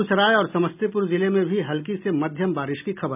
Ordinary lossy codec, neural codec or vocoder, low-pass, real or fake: none; none; 3.6 kHz; real